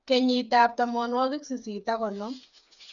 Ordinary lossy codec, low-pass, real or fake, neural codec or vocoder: none; 7.2 kHz; fake; codec, 16 kHz, 4 kbps, FreqCodec, smaller model